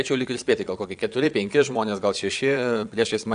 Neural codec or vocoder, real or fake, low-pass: vocoder, 22.05 kHz, 80 mel bands, Vocos; fake; 9.9 kHz